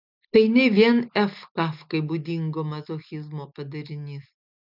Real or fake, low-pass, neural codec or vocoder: real; 5.4 kHz; none